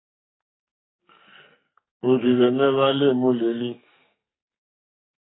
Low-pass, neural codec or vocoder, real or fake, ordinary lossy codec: 7.2 kHz; codec, 44.1 kHz, 2.6 kbps, SNAC; fake; AAC, 16 kbps